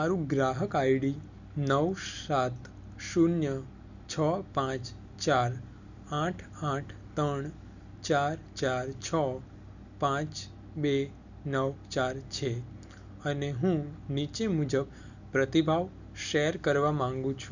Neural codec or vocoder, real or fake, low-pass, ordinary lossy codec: none; real; 7.2 kHz; none